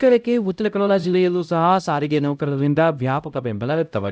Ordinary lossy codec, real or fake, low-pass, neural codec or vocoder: none; fake; none; codec, 16 kHz, 0.5 kbps, X-Codec, HuBERT features, trained on LibriSpeech